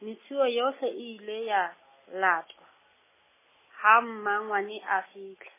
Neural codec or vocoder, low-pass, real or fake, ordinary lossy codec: none; 3.6 kHz; real; MP3, 16 kbps